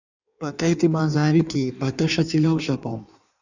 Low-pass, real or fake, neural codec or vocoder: 7.2 kHz; fake; codec, 16 kHz in and 24 kHz out, 1.1 kbps, FireRedTTS-2 codec